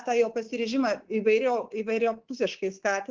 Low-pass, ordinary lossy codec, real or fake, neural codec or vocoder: 7.2 kHz; Opus, 16 kbps; fake; codec, 24 kHz, 3.1 kbps, DualCodec